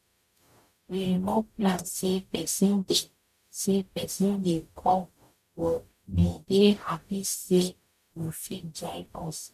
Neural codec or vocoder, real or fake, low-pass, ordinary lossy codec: codec, 44.1 kHz, 0.9 kbps, DAC; fake; 14.4 kHz; none